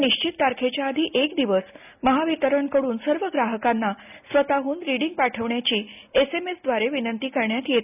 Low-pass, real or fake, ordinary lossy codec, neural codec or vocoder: 3.6 kHz; real; none; none